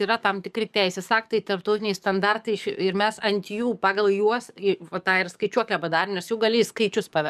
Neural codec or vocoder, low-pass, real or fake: codec, 44.1 kHz, 7.8 kbps, DAC; 14.4 kHz; fake